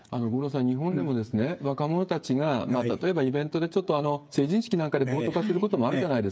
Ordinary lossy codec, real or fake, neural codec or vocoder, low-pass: none; fake; codec, 16 kHz, 8 kbps, FreqCodec, smaller model; none